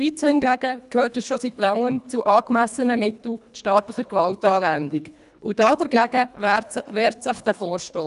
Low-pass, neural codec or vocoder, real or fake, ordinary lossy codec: 10.8 kHz; codec, 24 kHz, 1.5 kbps, HILCodec; fake; none